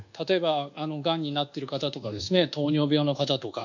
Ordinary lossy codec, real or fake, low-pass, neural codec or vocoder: none; fake; 7.2 kHz; codec, 24 kHz, 1.2 kbps, DualCodec